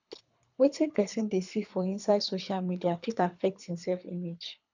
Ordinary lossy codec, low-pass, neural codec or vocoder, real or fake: none; 7.2 kHz; codec, 24 kHz, 3 kbps, HILCodec; fake